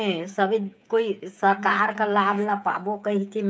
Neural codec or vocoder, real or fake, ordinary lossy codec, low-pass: codec, 16 kHz, 8 kbps, FreqCodec, smaller model; fake; none; none